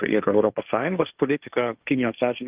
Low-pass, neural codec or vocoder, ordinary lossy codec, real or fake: 3.6 kHz; codec, 16 kHz, 1.1 kbps, Voila-Tokenizer; Opus, 24 kbps; fake